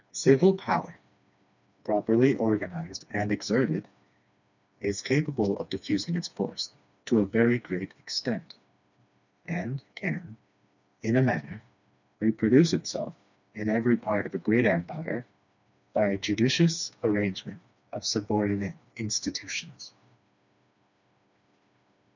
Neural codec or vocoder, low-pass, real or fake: codec, 16 kHz, 2 kbps, FreqCodec, smaller model; 7.2 kHz; fake